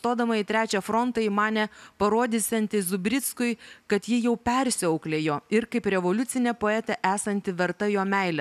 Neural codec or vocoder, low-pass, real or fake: none; 14.4 kHz; real